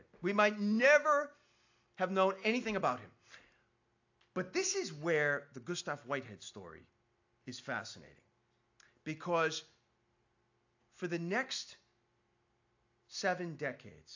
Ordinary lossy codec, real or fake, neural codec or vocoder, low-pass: AAC, 48 kbps; real; none; 7.2 kHz